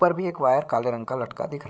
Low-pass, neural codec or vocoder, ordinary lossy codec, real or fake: none; codec, 16 kHz, 8 kbps, FreqCodec, larger model; none; fake